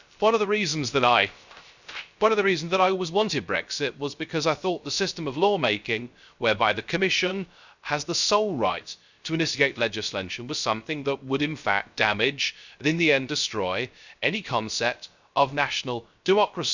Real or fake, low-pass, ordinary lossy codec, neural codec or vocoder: fake; 7.2 kHz; none; codec, 16 kHz, 0.3 kbps, FocalCodec